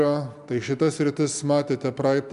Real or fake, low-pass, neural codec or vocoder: real; 10.8 kHz; none